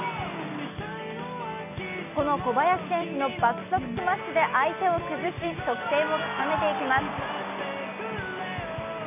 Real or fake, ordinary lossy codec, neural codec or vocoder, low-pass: real; none; none; 3.6 kHz